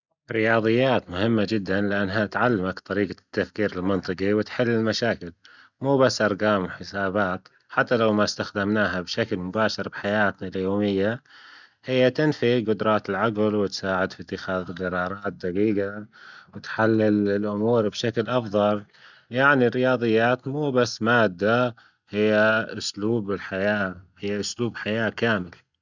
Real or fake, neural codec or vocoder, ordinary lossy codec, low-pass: real; none; none; 7.2 kHz